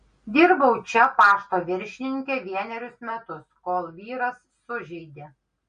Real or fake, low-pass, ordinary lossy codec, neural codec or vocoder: real; 9.9 kHz; MP3, 48 kbps; none